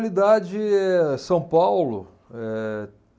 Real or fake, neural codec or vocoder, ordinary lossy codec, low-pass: real; none; none; none